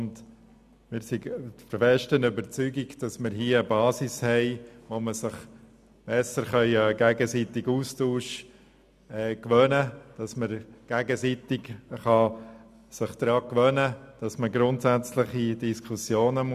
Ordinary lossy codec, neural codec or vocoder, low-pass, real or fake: none; none; 14.4 kHz; real